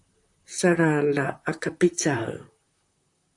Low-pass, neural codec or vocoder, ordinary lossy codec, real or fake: 10.8 kHz; vocoder, 44.1 kHz, 128 mel bands, Pupu-Vocoder; AAC, 64 kbps; fake